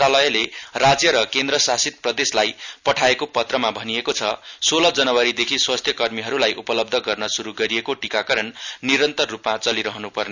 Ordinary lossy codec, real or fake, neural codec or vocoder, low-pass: none; real; none; 7.2 kHz